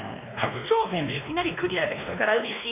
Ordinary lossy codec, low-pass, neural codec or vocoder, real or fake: none; 3.6 kHz; codec, 16 kHz, 1 kbps, X-Codec, WavLM features, trained on Multilingual LibriSpeech; fake